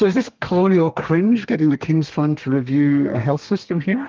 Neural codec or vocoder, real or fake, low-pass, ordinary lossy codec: codec, 32 kHz, 1.9 kbps, SNAC; fake; 7.2 kHz; Opus, 32 kbps